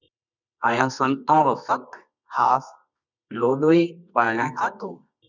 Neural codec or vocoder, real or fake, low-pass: codec, 24 kHz, 0.9 kbps, WavTokenizer, medium music audio release; fake; 7.2 kHz